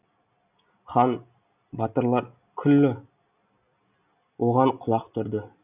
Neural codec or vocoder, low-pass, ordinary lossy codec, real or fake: none; 3.6 kHz; none; real